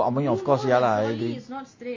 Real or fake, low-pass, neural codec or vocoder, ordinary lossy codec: real; 7.2 kHz; none; MP3, 32 kbps